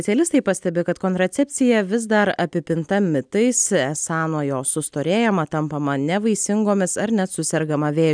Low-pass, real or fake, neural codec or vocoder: 9.9 kHz; real; none